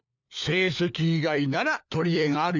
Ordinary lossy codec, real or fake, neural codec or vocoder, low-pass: none; fake; codec, 16 kHz, 4 kbps, FunCodec, trained on LibriTTS, 50 frames a second; 7.2 kHz